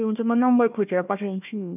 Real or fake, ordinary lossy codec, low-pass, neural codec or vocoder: fake; none; 3.6 kHz; codec, 16 kHz, 1 kbps, FunCodec, trained on Chinese and English, 50 frames a second